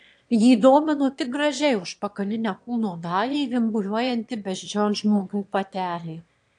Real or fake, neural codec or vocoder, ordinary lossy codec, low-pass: fake; autoencoder, 22.05 kHz, a latent of 192 numbers a frame, VITS, trained on one speaker; AAC, 64 kbps; 9.9 kHz